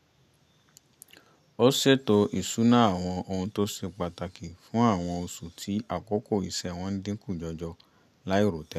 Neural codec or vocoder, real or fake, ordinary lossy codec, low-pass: none; real; none; 14.4 kHz